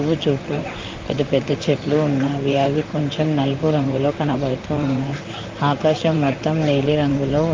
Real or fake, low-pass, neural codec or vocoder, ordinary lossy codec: fake; 7.2 kHz; vocoder, 44.1 kHz, 128 mel bands, Pupu-Vocoder; Opus, 24 kbps